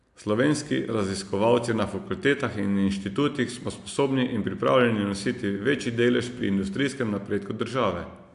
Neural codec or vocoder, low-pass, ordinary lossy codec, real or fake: none; 10.8 kHz; AAC, 64 kbps; real